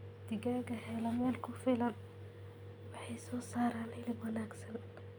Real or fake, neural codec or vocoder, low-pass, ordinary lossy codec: real; none; none; none